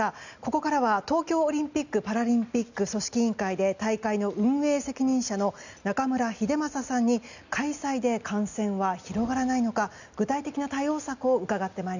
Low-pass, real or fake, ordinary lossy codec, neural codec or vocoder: 7.2 kHz; real; none; none